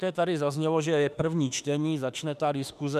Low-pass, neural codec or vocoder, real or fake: 14.4 kHz; autoencoder, 48 kHz, 32 numbers a frame, DAC-VAE, trained on Japanese speech; fake